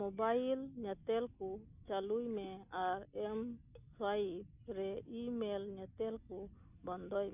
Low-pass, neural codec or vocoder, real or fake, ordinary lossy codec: 3.6 kHz; none; real; none